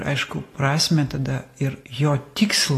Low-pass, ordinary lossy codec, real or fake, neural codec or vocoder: 14.4 kHz; AAC, 48 kbps; real; none